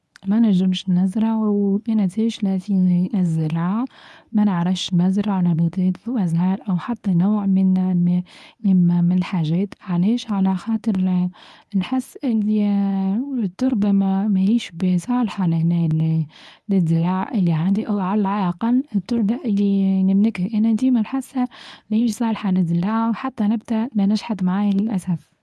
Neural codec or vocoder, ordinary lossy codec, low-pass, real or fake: codec, 24 kHz, 0.9 kbps, WavTokenizer, medium speech release version 1; none; none; fake